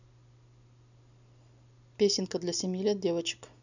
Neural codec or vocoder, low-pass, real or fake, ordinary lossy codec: none; 7.2 kHz; real; none